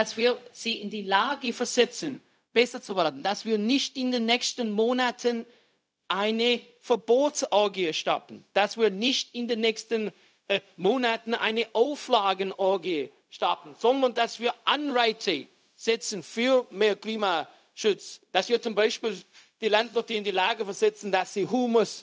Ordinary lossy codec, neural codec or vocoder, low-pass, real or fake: none; codec, 16 kHz, 0.4 kbps, LongCat-Audio-Codec; none; fake